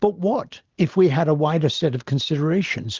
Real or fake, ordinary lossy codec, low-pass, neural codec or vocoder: real; Opus, 16 kbps; 7.2 kHz; none